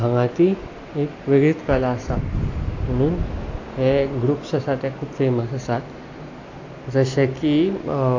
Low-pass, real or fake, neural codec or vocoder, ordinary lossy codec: 7.2 kHz; real; none; AAC, 48 kbps